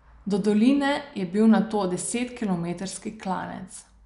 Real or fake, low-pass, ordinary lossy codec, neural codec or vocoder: real; 10.8 kHz; none; none